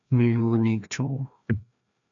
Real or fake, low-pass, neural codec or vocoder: fake; 7.2 kHz; codec, 16 kHz, 1 kbps, FreqCodec, larger model